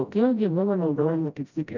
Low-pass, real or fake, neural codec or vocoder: 7.2 kHz; fake; codec, 16 kHz, 0.5 kbps, FreqCodec, smaller model